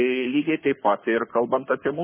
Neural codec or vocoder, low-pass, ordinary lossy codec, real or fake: codec, 44.1 kHz, 7.8 kbps, Pupu-Codec; 3.6 kHz; MP3, 16 kbps; fake